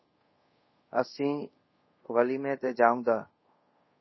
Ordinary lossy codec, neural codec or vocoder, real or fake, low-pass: MP3, 24 kbps; codec, 24 kHz, 0.5 kbps, DualCodec; fake; 7.2 kHz